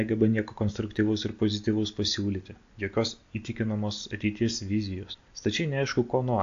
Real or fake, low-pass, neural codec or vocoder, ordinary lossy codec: real; 7.2 kHz; none; AAC, 48 kbps